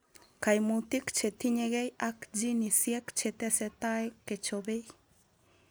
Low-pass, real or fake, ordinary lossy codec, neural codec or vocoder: none; real; none; none